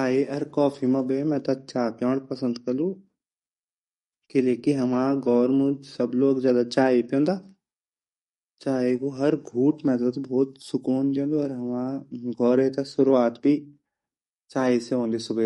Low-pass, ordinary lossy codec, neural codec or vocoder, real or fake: 19.8 kHz; MP3, 48 kbps; codec, 44.1 kHz, 7.8 kbps, DAC; fake